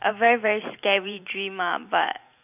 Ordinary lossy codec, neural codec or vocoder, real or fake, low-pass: none; vocoder, 44.1 kHz, 128 mel bands every 512 samples, BigVGAN v2; fake; 3.6 kHz